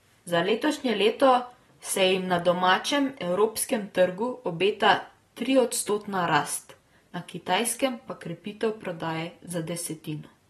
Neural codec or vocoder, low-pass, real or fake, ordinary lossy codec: none; 19.8 kHz; real; AAC, 32 kbps